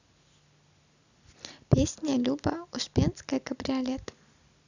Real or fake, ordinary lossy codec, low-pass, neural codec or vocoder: real; none; 7.2 kHz; none